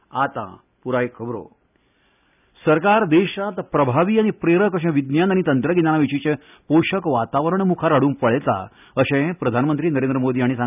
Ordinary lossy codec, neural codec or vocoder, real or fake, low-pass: none; none; real; 3.6 kHz